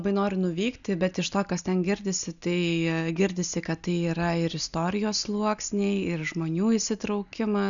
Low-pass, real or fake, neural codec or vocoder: 7.2 kHz; real; none